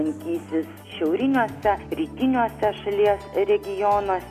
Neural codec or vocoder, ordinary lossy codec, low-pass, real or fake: none; AAC, 96 kbps; 14.4 kHz; real